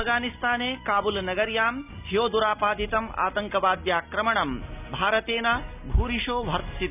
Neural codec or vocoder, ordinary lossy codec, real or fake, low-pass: none; none; real; 3.6 kHz